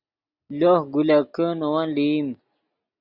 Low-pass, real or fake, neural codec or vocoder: 5.4 kHz; real; none